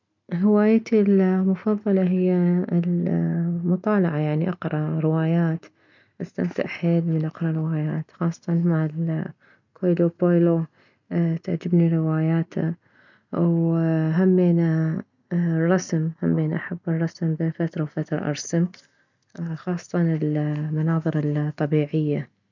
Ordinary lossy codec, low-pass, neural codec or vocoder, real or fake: none; 7.2 kHz; none; real